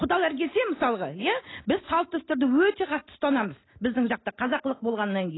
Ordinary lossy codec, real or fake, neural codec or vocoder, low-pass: AAC, 16 kbps; real; none; 7.2 kHz